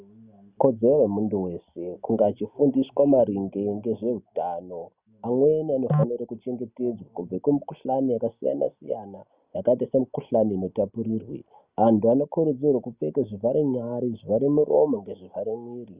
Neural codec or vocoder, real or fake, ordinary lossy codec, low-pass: none; real; Opus, 64 kbps; 3.6 kHz